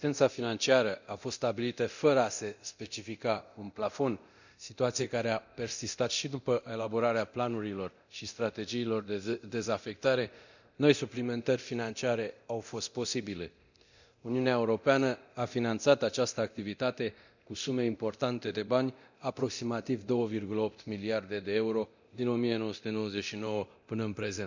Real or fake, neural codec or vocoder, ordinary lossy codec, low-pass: fake; codec, 24 kHz, 0.9 kbps, DualCodec; none; 7.2 kHz